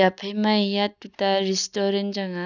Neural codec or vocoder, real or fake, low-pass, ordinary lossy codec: none; real; 7.2 kHz; none